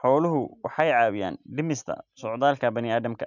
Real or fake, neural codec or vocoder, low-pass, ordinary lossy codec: real; none; 7.2 kHz; none